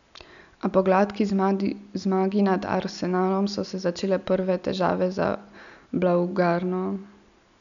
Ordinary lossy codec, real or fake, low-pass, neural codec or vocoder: none; real; 7.2 kHz; none